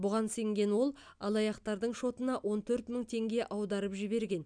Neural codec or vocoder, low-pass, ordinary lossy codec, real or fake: none; 9.9 kHz; none; real